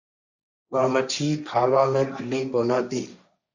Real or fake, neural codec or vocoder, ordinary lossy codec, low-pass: fake; codec, 16 kHz, 1.1 kbps, Voila-Tokenizer; Opus, 64 kbps; 7.2 kHz